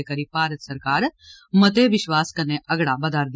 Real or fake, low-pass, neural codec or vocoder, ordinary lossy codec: real; none; none; none